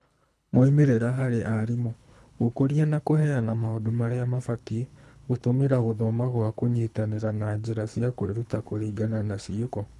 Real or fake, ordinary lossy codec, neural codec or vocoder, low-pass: fake; none; codec, 24 kHz, 3 kbps, HILCodec; none